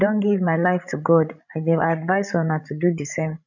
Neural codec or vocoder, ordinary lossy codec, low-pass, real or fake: codec, 16 kHz, 16 kbps, FreqCodec, larger model; none; 7.2 kHz; fake